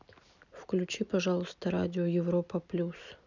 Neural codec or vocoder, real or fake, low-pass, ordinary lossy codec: none; real; 7.2 kHz; none